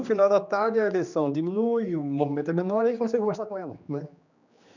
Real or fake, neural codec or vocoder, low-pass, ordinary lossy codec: fake; codec, 16 kHz, 2 kbps, X-Codec, HuBERT features, trained on general audio; 7.2 kHz; none